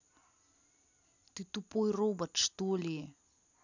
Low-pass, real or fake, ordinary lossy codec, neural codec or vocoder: 7.2 kHz; real; none; none